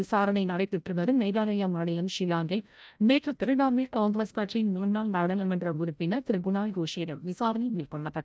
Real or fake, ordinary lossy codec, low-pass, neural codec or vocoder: fake; none; none; codec, 16 kHz, 0.5 kbps, FreqCodec, larger model